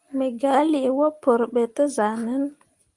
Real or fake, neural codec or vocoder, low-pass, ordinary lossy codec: real; none; 10.8 kHz; Opus, 32 kbps